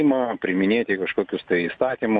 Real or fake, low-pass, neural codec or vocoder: real; 9.9 kHz; none